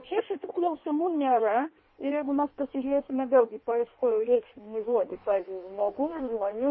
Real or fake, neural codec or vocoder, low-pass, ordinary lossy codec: fake; codec, 16 kHz in and 24 kHz out, 1.1 kbps, FireRedTTS-2 codec; 7.2 kHz; MP3, 24 kbps